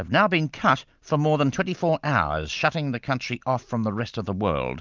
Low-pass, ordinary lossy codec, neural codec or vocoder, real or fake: 7.2 kHz; Opus, 24 kbps; none; real